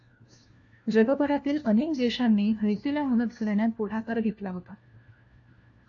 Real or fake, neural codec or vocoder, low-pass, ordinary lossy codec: fake; codec, 16 kHz, 1 kbps, FunCodec, trained on LibriTTS, 50 frames a second; 7.2 kHz; AAC, 48 kbps